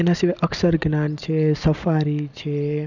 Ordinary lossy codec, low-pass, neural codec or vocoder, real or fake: none; 7.2 kHz; none; real